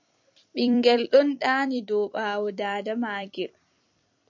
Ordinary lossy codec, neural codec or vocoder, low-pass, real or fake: MP3, 64 kbps; vocoder, 44.1 kHz, 128 mel bands every 256 samples, BigVGAN v2; 7.2 kHz; fake